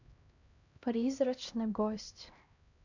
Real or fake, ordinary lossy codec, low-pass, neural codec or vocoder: fake; none; 7.2 kHz; codec, 16 kHz, 1 kbps, X-Codec, HuBERT features, trained on LibriSpeech